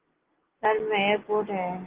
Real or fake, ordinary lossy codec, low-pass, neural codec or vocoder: real; Opus, 16 kbps; 3.6 kHz; none